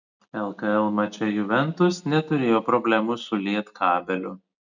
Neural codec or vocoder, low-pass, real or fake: none; 7.2 kHz; real